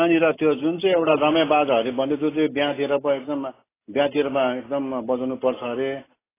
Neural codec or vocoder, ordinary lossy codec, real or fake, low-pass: none; AAC, 16 kbps; real; 3.6 kHz